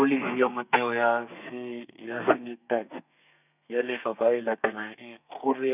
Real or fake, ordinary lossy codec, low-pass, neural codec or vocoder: fake; none; 3.6 kHz; codec, 32 kHz, 1.9 kbps, SNAC